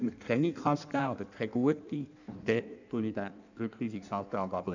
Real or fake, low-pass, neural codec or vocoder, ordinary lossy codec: fake; 7.2 kHz; codec, 16 kHz in and 24 kHz out, 1.1 kbps, FireRedTTS-2 codec; none